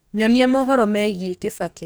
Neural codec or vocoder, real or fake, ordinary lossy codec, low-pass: codec, 44.1 kHz, 2.6 kbps, DAC; fake; none; none